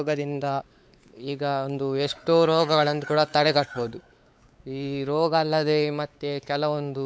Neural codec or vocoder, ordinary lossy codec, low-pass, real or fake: codec, 16 kHz, 4 kbps, X-Codec, WavLM features, trained on Multilingual LibriSpeech; none; none; fake